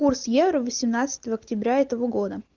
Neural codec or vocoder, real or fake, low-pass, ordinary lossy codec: none; real; 7.2 kHz; Opus, 32 kbps